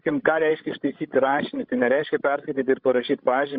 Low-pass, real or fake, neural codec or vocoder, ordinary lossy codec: 5.4 kHz; fake; codec, 16 kHz, 16 kbps, FreqCodec, larger model; Opus, 64 kbps